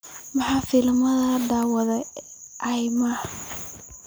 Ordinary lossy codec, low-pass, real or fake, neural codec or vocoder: none; none; real; none